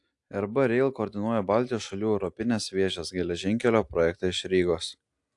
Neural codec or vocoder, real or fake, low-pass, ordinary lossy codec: none; real; 10.8 kHz; AAC, 64 kbps